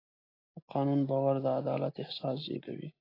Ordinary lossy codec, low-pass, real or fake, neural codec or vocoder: AAC, 24 kbps; 5.4 kHz; real; none